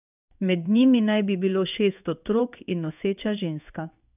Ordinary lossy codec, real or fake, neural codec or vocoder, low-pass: none; fake; codec, 16 kHz in and 24 kHz out, 1 kbps, XY-Tokenizer; 3.6 kHz